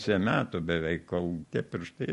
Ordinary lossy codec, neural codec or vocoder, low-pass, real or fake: MP3, 48 kbps; none; 14.4 kHz; real